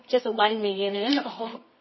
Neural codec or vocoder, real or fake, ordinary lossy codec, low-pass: codec, 24 kHz, 0.9 kbps, WavTokenizer, medium music audio release; fake; MP3, 24 kbps; 7.2 kHz